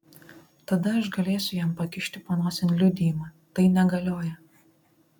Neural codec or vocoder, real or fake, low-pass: none; real; 19.8 kHz